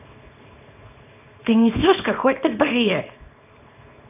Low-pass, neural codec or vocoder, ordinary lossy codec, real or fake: 3.6 kHz; codec, 24 kHz, 0.9 kbps, WavTokenizer, small release; none; fake